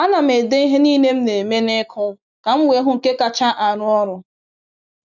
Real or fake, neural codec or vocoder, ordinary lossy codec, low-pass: real; none; none; 7.2 kHz